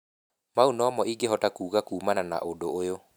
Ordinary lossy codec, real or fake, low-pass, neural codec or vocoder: none; real; none; none